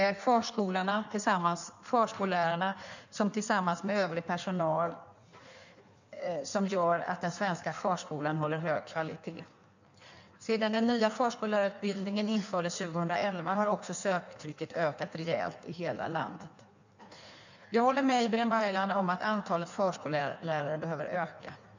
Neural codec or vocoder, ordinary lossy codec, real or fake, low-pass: codec, 16 kHz in and 24 kHz out, 1.1 kbps, FireRedTTS-2 codec; none; fake; 7.2 kHz